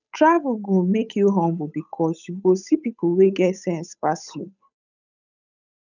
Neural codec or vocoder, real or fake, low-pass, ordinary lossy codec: codec, 16 kHz, 8 kbps, FunCodec, trained on Chinese and English, 25 frames a second; fake; 7.2 kHz; none